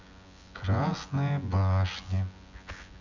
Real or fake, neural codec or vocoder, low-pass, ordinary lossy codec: fake; vocoder, 24 kHz, 100 mel bands, Vocos; 7.2 kHz; none